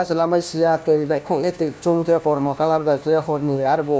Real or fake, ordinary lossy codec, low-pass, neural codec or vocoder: fake; none; none; codec, 16 kHz, 1 kbps, FunCodec, trained on LibriTTS, 50 frames a second